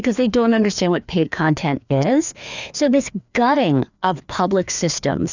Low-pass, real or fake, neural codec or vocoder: 7.2 kHz; fake; codec, 16 kHz, 2 kbps, FreqCodec, larger model